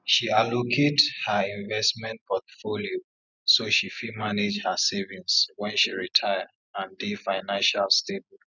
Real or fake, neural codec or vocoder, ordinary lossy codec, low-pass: real; none; none; 7.2 kHz